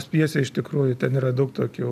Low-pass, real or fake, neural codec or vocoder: 14.4 kHz; real; none